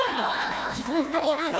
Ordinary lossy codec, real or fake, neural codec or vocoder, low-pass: none; fake; codec, 16 kHz, 1 kbps, FunCodec, trained on Chinese and English, 50 frames a second; none